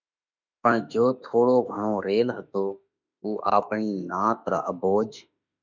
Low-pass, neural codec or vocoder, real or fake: 7.2 kHz; autoencoder, 48 kHz, 32 numbers a frame, DAC-VAE, trained on Japanese speech; fake